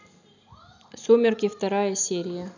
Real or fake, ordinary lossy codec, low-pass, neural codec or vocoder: real; none; 7.2 kHz; none